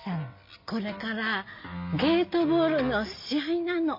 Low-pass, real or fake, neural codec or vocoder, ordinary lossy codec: 5.4 kHz; real; none; MP3, 32 kbps